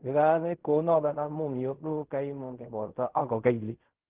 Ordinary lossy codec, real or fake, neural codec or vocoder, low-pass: Opus, 16 kbps; fake; codec, 16 kHz in and 24 kHz out, 0.4 kbps, LongCat-Audio-Codec, fine tuned four codebook decoder; 3.6 kHz